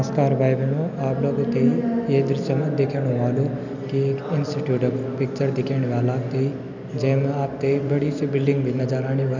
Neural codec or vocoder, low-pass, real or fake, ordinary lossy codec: none; 7.2 kHz; real; none